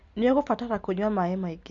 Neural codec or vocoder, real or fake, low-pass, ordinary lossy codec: none; real; 7.2 kHz; Opus, 64 kbps